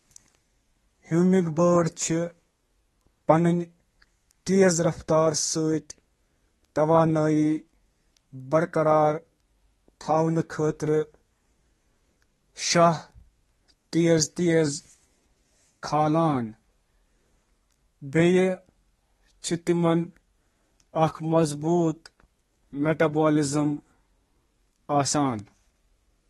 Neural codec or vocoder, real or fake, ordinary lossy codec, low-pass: codec, 32 kHz, 1.9 kbps, SNAC; fake; AAC, 32 kbps; 14.4 kHz